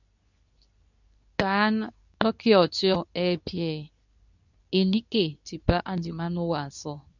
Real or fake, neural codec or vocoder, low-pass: fake; codec, 24 kHz, 0.9 kbps, WavTokenizer, medium speech release version 2; 7.2 kHz